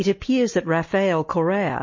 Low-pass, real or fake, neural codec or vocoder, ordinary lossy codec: 7.2 kHz; real; none; MP3, 32 kbps